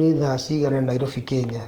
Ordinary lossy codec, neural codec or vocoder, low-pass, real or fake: Opus, 24 kbps; codec, 44.1 kHz, 7.8 kbps, DAC; 19.8 kHz; fake